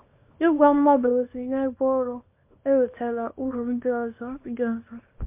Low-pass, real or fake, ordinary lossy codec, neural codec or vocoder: 3.6 kHz; fake; MP3, 24 kbps; codec, 24 kHz, 0.9 kbps, WavTokenizer, small release